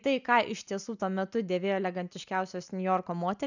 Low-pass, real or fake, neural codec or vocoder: 7.2 kHz; real; none